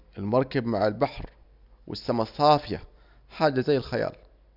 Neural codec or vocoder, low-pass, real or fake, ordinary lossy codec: none; 5.4 kHz; real; none